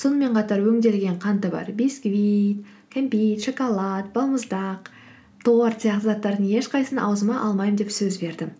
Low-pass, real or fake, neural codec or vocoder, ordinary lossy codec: none; real; none; none